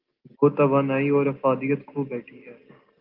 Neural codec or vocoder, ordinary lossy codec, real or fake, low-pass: none; Opus, 32 kbps; real; 5.4 kHz